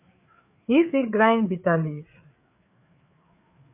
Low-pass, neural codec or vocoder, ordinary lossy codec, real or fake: 3.6 kHz; codec, 16 kHz, 4 kbps, FreqCodec, larger model; AAC, 32 kbps; fake